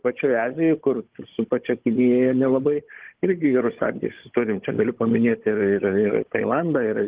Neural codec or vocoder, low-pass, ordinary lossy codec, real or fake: codec, 16 kHz, 16 kbps, FunCodec, trained on Chinese and English, 50 frames a second; 3.6 kHz; Opus, 16 kbps; fake